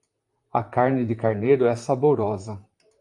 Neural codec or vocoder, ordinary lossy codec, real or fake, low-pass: vocoder, 44.1 kHz, 128 mel bands, Pupu-Vocoder; AAC, 64 kbps; fake; 10.8 kHz